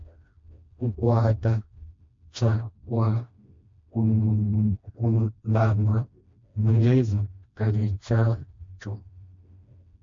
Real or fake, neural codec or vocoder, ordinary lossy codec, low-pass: fake; codec, 16 kHz, 1 kbps, FreqCodec, smaller model; MP3, 48 kbps; 7.2 kHz